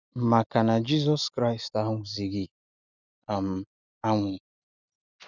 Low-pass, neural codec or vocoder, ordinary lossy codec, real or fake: 7.2 kHz; none; none; real